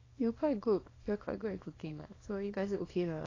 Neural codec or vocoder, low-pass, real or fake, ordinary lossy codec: codec, 24 kHz, 0.9 kbps, WavTokenizer, small release; 7.2 kHz; fake; AAC, 32 kbps